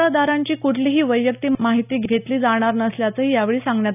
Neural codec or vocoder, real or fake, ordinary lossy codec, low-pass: none; real; none; 3.6 kHz